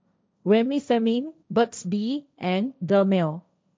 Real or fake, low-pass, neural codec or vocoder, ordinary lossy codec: fake; none; codec, 16 kHz, 1.1 kbps, Voila-Tokenizer; none